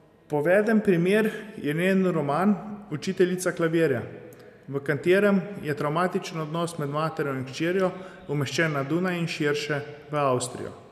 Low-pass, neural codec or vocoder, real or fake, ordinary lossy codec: 14.4 kHz; none; real; none